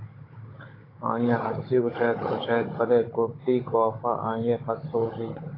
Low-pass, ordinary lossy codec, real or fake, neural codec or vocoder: 5.4 kHz; AAC, 24 kbps; fake; codec, 16 kHz, 16 kbps, FunCodec, trained on Chinese and English, 50 frames a second